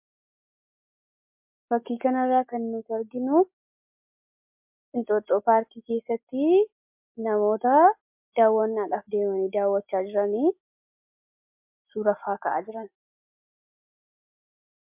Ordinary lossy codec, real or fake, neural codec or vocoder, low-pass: MP3, 32 kbps; real; none; 3.6 kHz